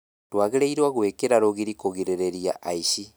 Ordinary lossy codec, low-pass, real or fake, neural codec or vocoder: none; none; real; none